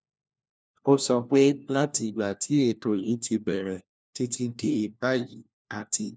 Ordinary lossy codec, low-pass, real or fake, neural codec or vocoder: none; none; fake; codec, 16 kHz, 1 kbps, FunCodec, trained on LibriTTS, 50 frames a second